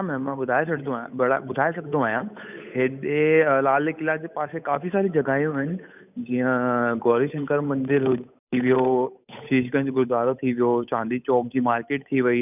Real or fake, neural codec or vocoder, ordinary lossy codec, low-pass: fake; codec, 16 kHz, 8 kbps, FunCodec, trained on Chinese and English, 25 frames a second; none; 3.6 kHz